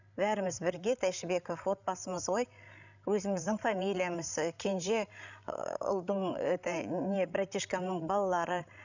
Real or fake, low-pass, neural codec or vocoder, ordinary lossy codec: fake; 7.2 kHz; codec, 16 kHz, 16 kbps, FreqCodec, larger model; none